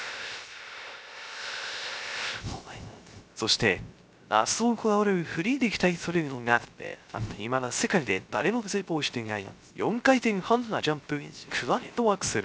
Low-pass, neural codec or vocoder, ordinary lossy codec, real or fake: none; codec, 16 kHz, 0.3 kbps, FocalCodec; none; fake